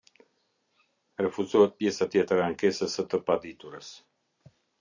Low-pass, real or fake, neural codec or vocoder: 7.2 kHz; real; none